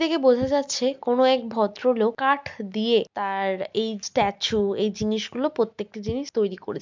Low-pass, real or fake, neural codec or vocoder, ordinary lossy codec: 7.2 kHz; real; none; MP3, 64 kbps